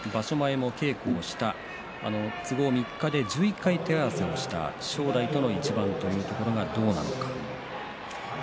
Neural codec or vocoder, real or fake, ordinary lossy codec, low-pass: none; real; none; none